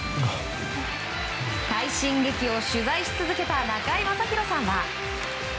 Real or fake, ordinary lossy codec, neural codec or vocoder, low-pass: real; none; none; none